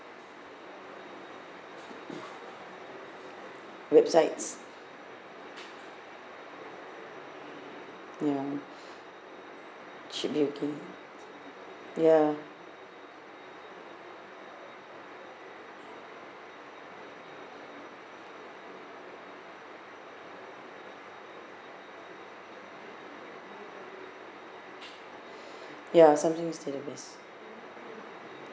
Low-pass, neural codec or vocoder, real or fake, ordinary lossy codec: none; none; real; none